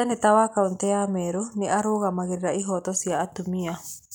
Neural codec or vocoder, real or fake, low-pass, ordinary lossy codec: none; real; none; none